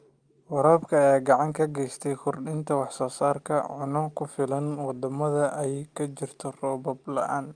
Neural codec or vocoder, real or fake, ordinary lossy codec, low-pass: none; real; Opus, 32 kbps; 9.9 kHz